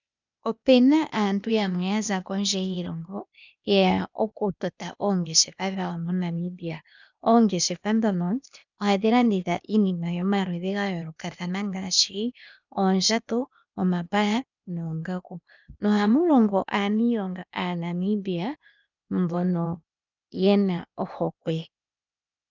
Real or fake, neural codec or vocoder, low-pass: fake; codec, 16 kHz, 0.8 kbps, ZipCodec; 7.2 kHz